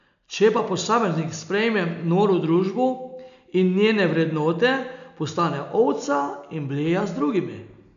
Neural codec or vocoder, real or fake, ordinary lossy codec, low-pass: none; real; none; 7.2 kHz